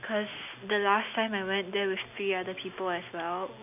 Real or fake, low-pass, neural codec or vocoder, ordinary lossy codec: real; 3.6 kHz; none; none